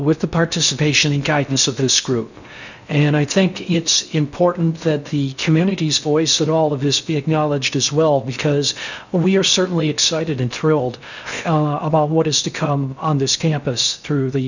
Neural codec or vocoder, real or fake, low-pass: codec, 16 kHz in and 24 kHz out, 0.6 kbps, FocalCodec, streaming, 4096 codes; fake; 7.2 kHz